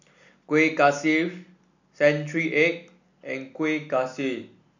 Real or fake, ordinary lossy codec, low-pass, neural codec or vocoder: real; none; 7.2 kHz; none